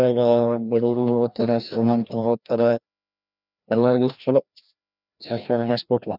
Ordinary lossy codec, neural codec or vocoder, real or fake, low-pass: none; codec, 16 kHz, 1 kbps, FreqCodec, larger model; fake; 5.4 kHz